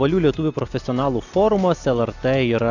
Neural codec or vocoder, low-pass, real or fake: none; 7.2 kHz; real